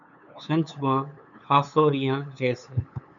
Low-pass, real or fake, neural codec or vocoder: 7.2 kHz; fake; codec, 16 kHz, 8 kbps, FunCodec, trained on LibriTTS, 25 frames a second